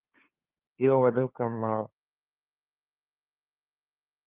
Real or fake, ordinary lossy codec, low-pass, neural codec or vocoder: fake; Opus, 24 kbps; 3.6 kHz; codec, 16 kHz, 2 kbps, FunCodec, trained on LibriTTS, 25 frames a second